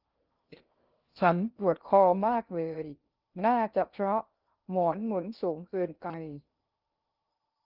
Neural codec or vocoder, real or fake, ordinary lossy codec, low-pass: codec, 16 kHz in and 24 kHz out, 0.6 kbps, FocalCodec, streaming, 2048 codes; fake; Opus, 32 kbps; 5.4 kHz